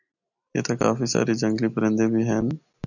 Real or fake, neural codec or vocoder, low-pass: real; none; 7.2 kHz